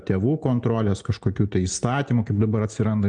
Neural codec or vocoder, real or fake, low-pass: none; real; 9.9 kHz